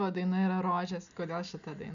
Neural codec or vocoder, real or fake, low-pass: none; real; 7.2 kHz